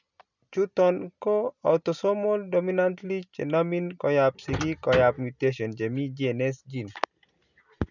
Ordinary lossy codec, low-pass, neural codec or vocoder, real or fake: none; 7.2 kHz; none; real